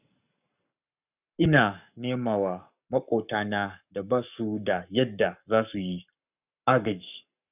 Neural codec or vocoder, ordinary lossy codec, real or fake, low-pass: codec, 44.1 kHz, 7.8 kbps, Pupu-Codec; none; fake; 3.6 kHz